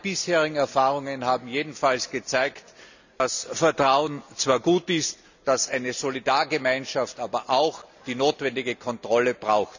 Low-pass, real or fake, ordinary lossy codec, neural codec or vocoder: 7.2 kHz; real; none; none